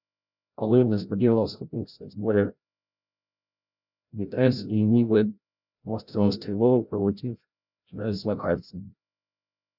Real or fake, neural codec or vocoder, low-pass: fake; codec, 16 kHz, 0.5 kbps, FreqCodec, larger model; 5.4 kHz